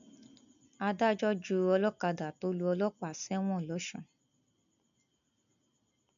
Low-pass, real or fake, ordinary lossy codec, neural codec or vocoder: 7.2 kHz; real; none; none